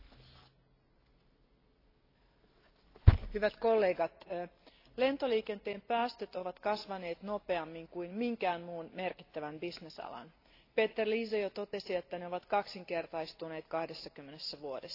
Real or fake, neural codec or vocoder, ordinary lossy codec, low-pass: real; none; AAC, 32 kbps; 5.4 kHz